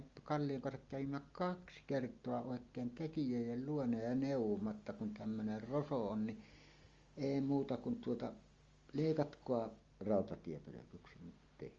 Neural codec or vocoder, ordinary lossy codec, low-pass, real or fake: none; Opus, 32 kbps; 7.2 kHz; real